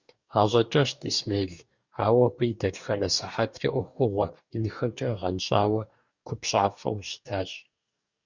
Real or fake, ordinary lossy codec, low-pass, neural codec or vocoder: fake; Opus, 64 kbps; 7.2 kHz; codec, 44.1 kHz, 2.6 kbps, DAC